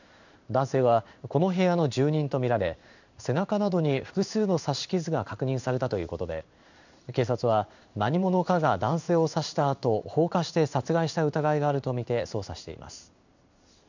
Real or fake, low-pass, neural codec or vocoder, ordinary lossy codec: fake; 7.2 kHz; codec, 16 kHz in and 24 kHz out, 1 kbps, XY-Tokenizer; none